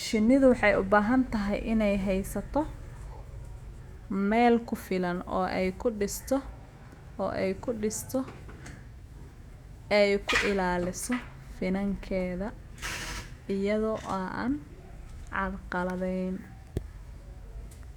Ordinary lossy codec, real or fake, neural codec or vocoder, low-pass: Opus, 64 kbps; fake; autoencoder, 48 kHz, 128 numbers a frame, DAC-VAE, trained on Japanese speech; 19.8 kHz